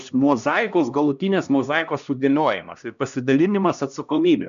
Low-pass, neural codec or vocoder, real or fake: 7.2 kHz; codec, 16 kHz, 1 kbps, X-Codec, HuBERT features, trained on LibriSpeech; fake